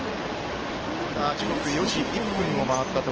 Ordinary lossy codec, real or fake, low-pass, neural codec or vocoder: Opus, 16 kbps; real; 7.2 kHz; none